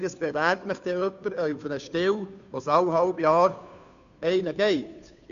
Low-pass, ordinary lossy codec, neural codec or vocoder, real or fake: 7.2 kHz; none; codec, 16 kHz, 2 kbps, FunCodec, trained on Chinese and English, 25 frames a second; fake